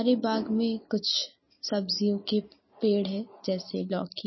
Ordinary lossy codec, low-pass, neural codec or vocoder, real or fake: MP3, 24 kbps; 7.2 kHz; none; real